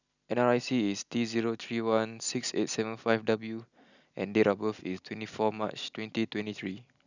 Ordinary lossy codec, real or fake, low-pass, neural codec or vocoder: none; real; 7.2 kHz; none